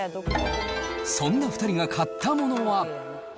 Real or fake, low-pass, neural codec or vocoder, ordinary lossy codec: real; none; none; none